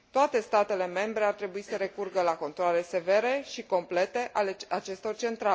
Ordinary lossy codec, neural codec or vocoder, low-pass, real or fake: none; none; none; real